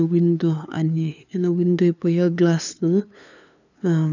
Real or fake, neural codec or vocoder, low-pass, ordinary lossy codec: fake; codec, 16 kHz, 2 kbps, FunCodec, trained on LibriTTS, 25 frames a second; 7.2 kHz; none